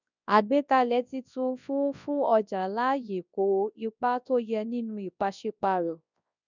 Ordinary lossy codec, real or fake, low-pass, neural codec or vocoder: none; fake; 7.2 kHz; codec, 24 kHz, 0.9 kbps, WavTokenizer, large speech release